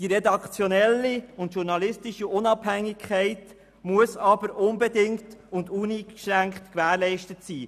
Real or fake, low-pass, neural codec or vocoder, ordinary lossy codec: real; 14.4 kHz; none; none